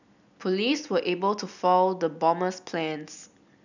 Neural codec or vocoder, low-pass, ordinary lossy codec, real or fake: none; 7.2 kHz; none; real